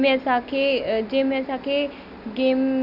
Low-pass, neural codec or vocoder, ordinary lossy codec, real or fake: 5.4 kHz; none; none; real